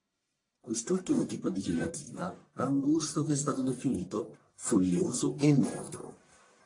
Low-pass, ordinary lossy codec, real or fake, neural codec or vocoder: 10.8 kHz; AAC, 48 kbps; fake; codec, 44.1 kHz, 1.7 kbps, Pupu-Codec